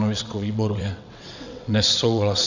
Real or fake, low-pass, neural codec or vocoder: fake; 7.2 kHz; vocoder, 22.05 kHz, 80 mel bands, WaveNeXt